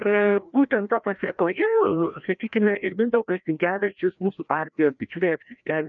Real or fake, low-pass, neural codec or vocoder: fake; 7.2 kHz; codec, 16 kHz, 1 kbps, FreqCodec, larger model